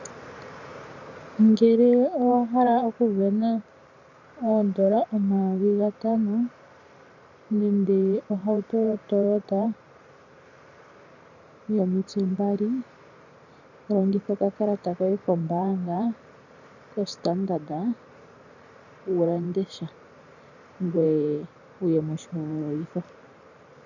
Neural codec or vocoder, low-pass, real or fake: vocoder, 44.1 kHz, 128 mel bands every 512 samples, BigVGAN v2; 7.2 kHz; fake